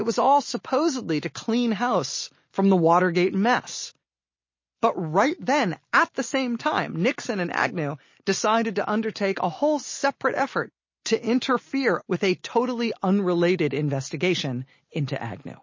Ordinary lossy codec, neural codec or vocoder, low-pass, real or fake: MP3, 32 kbps; none; 7.2 kHz; real